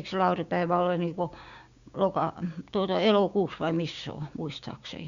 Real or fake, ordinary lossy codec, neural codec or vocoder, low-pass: fake; Opus, 64 kbps; codec, 16 kHz, 6 kbps, DAC; 7.2 kHz